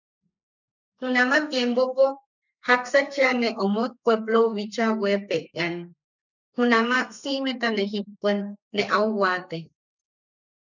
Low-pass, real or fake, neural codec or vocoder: 7.2 kHz; fake; codec, 32 kHz, 1.9 kbps, SNAC